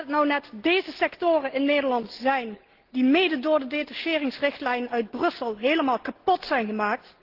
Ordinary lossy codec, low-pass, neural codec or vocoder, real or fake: Opus, 16 kbps; 5.4 kHz; none; real